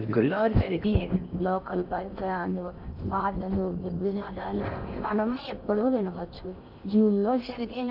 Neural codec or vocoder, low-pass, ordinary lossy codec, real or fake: codec, 16 kHz in and 24 kHz out, 0.6 kbps, FocalCodec, streaming, 4096 codes; 5.4 kHz; none; fake